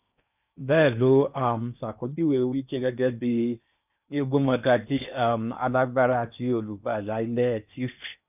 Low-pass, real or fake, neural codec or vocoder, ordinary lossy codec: 3.6 kHz; fake; codec, 16 kHz in and 24 kHz out, 0.8 kbps, FocalCodec, streaming, 65536 codes; none